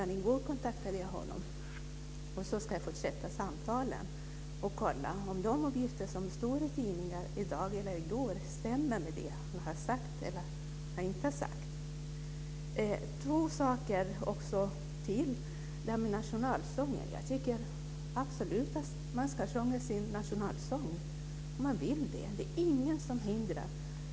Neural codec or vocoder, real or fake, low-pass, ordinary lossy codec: none; real; none; none